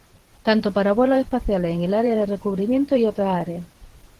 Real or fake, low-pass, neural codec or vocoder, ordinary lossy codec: fake; 14.4 kHz; vocoder, 44.1 kHz, 128 mel bands every 512 samples, BigVGAN v2; Opus, 16 kbps